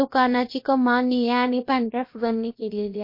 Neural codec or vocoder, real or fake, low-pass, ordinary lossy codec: codec, 16 kHz, about 1 kbps, DyCAST, with the encoder's durations; fake; 5.4 kHz; MP3, 32 kbps